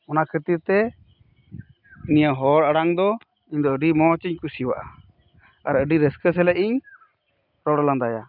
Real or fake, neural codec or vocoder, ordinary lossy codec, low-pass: real; none; none; 5.4 kHz